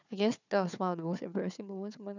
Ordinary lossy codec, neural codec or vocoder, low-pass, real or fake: none; codec, 16 kHz, 4 kbps, FunCodec, trained on Chinese and English, 50 frames a second; 7.2 kHz; fake